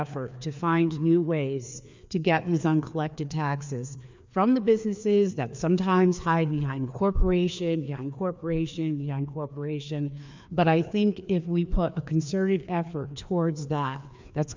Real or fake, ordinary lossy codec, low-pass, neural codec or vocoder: fake; MP3, 64 kbps; 7.2 kHz; codec, 16 kHz, 2 kbps, FreqCodec, larger model